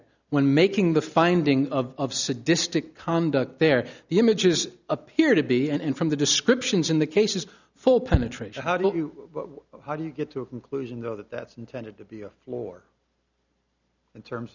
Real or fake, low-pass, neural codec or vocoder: real; 7.2 kHz; none